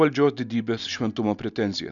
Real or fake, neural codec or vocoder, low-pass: real; none; 7.2 kHz